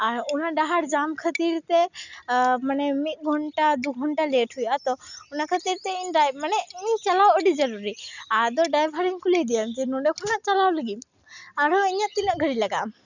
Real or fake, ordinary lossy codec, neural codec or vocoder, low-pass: real; none; none; 7.2 kHz